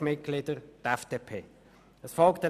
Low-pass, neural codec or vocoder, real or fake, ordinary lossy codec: 14.4 kHz; none; real; none